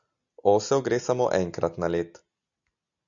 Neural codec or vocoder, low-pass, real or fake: none; 7.2 kHz; real